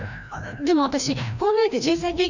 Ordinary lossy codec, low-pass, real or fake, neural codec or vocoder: none; 7.2 kHz; fake; codec, 16 kHz, 1 kbps, FreqCodec, larger model